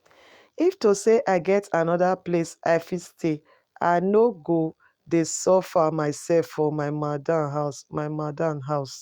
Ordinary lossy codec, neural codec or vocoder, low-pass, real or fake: Opus, 64 kbps; autoencoder, 48 kHz, 128 numbers a frame, DAC-VAE, trained on Japanese speech; 19.8 kHz; fake